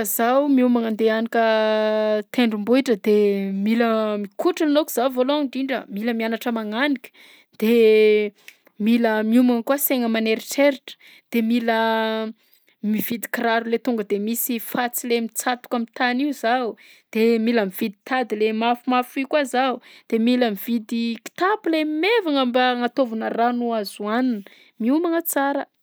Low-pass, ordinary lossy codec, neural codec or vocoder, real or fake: none; none; none; real